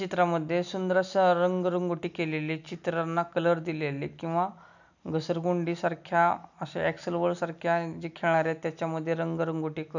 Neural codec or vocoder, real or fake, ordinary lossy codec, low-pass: none; real; none; 7.2 kHz